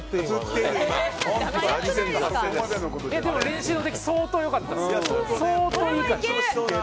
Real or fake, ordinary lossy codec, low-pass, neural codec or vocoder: real; none; none; none